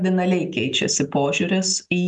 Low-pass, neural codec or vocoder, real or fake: 10.8 kHz; none; real